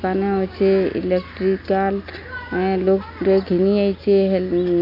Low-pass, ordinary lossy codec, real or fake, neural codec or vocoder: 5.4 kHz; none; real; none